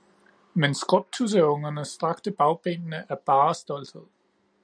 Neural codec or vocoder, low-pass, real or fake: none; 9.9 kHz; real